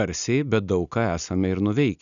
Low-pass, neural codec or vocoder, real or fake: 7.2 kHz; none; real